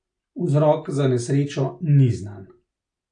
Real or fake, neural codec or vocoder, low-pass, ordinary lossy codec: real; none; 9.9 kHz; AAC, 48 kbps